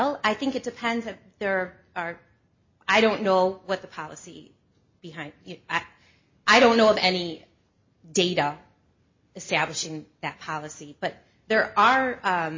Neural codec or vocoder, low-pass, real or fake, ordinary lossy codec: none; 7.2 kHz; real; MP3, 32 kbps